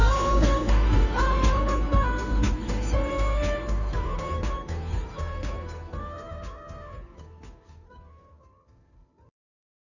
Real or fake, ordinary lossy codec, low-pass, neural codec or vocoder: fake; none; 7.2 kHz; codec, 16 kHz, 2 kbps, FunCodec, trained on Chinese and English, 25 frames a second